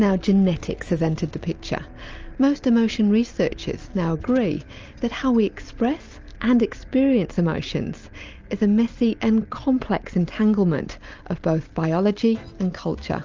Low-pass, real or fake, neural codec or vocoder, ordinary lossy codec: 7.2 kHz; real; none; Opus, 24 kbps